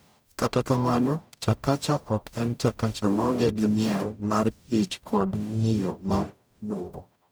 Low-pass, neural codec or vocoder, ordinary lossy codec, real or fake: none; codec, 44.1 kHz, 0.9 kbps, DAC; none; fake